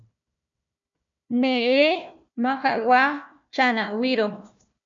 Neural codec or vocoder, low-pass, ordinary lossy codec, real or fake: codec, 16 kHz, 1 kbps, FunCodec, trained on Chinese and English, 50 frames a second; 7.2 kHz; MP3, 64 kbps; fake